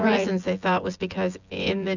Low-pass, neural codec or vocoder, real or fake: 7.2 kHz; vocoder, 24 kHz, 100 mel bands, Vocos; fake